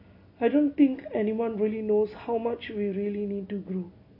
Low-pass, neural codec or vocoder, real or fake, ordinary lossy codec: 5.4 kHz; none; real; MP3, 32 kbps